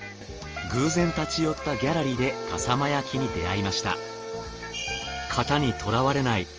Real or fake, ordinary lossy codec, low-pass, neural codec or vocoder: real; Opus, 24 kbps; 7.2 kHz; none